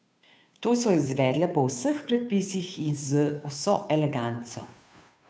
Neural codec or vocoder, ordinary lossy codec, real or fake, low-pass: codec, 16 kHz, 2 kbps, FunCodec, trained on Chinese and English, 25 frames a second; none; fake; none